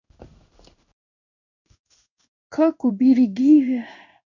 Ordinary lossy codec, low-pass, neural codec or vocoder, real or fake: none; 7.2 kHz; codec, 16 kHz in and 24 kHz out, 1 kbps, XY-Tokenizer; fake